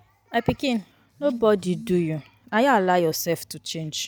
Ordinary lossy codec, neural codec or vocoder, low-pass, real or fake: none; none; none; real